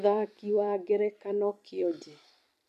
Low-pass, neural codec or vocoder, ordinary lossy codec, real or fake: 14.4 kHz; vocoder, 44.1 kHz, 128 mel bands every 256 samples, BigVGAN v2; none; fake